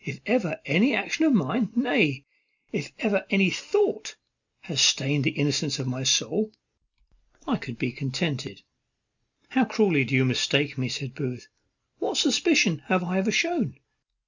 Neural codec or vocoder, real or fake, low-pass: none; real; 7.2 kHz